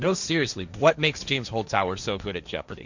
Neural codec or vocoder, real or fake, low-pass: codec, 16 kHz, 1.1 kbps, Voila-Tokenizer; fake; 7.2 kHz